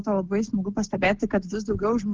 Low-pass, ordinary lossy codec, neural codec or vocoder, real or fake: 7.2 kHz; Opus, 16 kbps; none; real